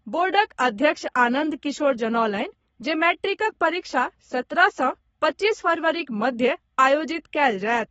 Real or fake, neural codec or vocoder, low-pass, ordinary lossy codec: fake; codec, 44.1 kHz, 7.8 kbps, Pupu-Codec; 19.8 kHz; AAC, 24 kbps